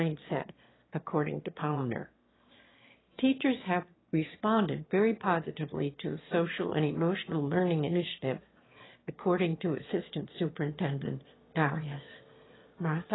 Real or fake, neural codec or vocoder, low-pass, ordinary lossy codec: fake; autoencoder, 22.05 kHz, a latent of 192 numbers a frame, VITS, trained on one speaker; 7.2 kHz; AAC, 16 kbps